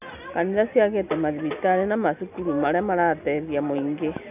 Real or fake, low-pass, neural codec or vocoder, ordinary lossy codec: real; 3.6 kHz; none; none